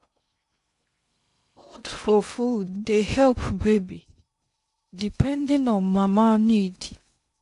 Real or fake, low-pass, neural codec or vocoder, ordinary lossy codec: fake; 10.8 kHz; codec, 16 kHz in and 24 kHz out, 0.6 kbps, FocalCodec, streaming, 2048 codes; AAC, 64 kbps